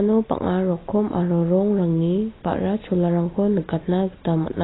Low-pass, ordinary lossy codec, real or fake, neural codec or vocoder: 7.2 kHz; AAC, 16 kbps; real; none